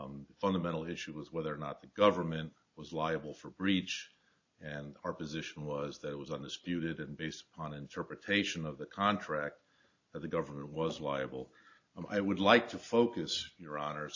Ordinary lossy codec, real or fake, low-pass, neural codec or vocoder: AAC, 48 kbps; real; 7.2 kHz; none